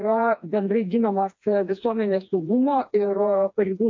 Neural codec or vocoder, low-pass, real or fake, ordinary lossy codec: codec, 16 kHz, 2 kbps, FreqCodec, smaller model; 7.2 kHz; fake; MP3, 48 kbps